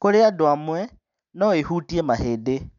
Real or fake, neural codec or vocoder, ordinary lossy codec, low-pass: real; none; none; 7.2 kHz